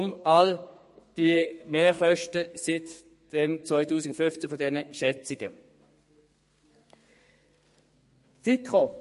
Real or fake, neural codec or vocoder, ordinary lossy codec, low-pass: fake; codec, 32 kHz, 1.9 kbps, SNAC; MP3, 48 kbps; 14.4 kHz